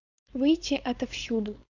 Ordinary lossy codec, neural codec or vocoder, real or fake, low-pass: Opus, 64 kbps; codec, 16 kHz, 4.8 kbps, FACodec; fake; 7.2 kHz